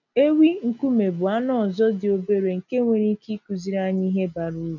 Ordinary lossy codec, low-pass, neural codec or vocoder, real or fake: none; 7.2 kHz; none; real